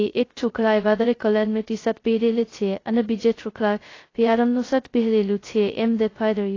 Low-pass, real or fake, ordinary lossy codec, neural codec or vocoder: 7.2 kHz; fake; AAC, 32 kbps; codec, 16 kHz, 0.2 kbps, FocalCodec